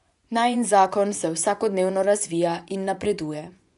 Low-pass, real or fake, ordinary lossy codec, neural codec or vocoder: 10.8 kHz; fake; none; vocoder, 24 kHz, 100 mel bands, Vocos